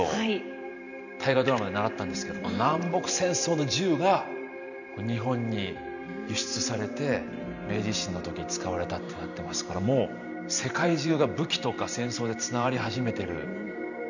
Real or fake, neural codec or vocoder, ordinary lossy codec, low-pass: fake; vocoder, 44.1 kHz, 128 mel bands every 512 samples, BigVGAN v2; none; 7.2 kHz